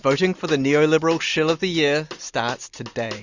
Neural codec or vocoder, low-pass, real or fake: none; 7.2 kHz; real